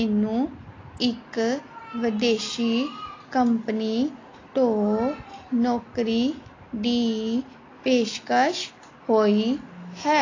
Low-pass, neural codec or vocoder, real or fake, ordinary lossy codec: 7.2 kHz; none; real; AAC, 32 kbps